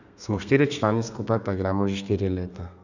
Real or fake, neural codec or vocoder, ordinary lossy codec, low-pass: fake; codec, 32 kHz, 1.9 kbps, SNAC; none; 7.2 kHz